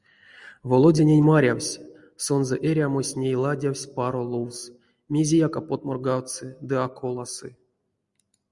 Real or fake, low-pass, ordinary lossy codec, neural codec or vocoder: real; 10.8 kHz; Opus, 64 kbps; none